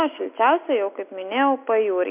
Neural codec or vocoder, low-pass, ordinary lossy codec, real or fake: none; 3.6 kHz; MP3, 32 kbps; real